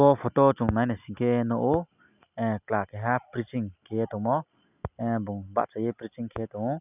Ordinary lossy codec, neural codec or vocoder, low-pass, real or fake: none; none; 3.6 kHz; real